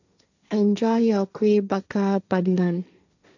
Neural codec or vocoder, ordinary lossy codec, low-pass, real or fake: codec, 16 kHz, 1.1 kbps, Voila-Tokenizer; none; 7.2 kHz; fake